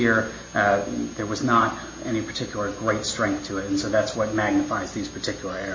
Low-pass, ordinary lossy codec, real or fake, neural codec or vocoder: 7.2 kHz; MP3, 48 kbps; real; none